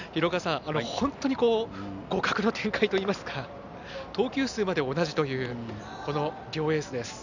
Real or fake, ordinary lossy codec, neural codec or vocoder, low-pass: real; none; none; 7.2 kHz